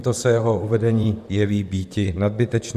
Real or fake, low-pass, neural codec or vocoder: fake; 14.4 kHz; vocoder, 44.1 kHz, 128 mel bands, Pupu-Vocoder